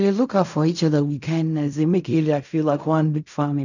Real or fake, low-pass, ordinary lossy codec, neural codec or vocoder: fake; 7.2 kHz; none; codec, 16 kHz in and 24 kHz out, 0.4 kbps, LongCat-Audio-Codec, fine tuned four codebook decoder